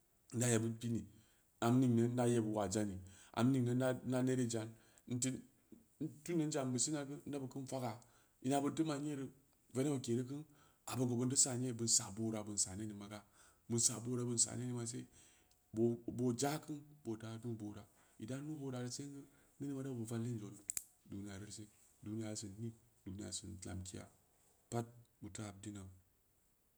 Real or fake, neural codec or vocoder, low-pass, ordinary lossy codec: real; none; none; none